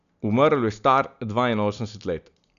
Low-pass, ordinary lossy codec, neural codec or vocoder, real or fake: 7.2 kHz; none; none; real